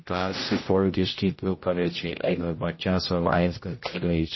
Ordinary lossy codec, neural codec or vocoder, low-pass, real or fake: MP3, 24 kbps; codec, 16 kHz, 0.5 kbps, X-Codec, HuBERT features, trained on general audio; 7.2 kHz; fake